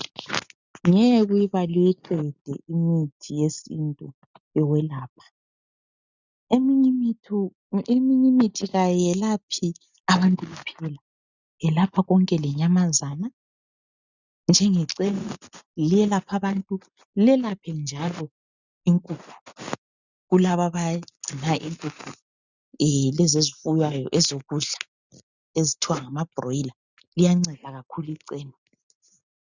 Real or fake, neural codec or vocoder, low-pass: real; none; 7.2 kHz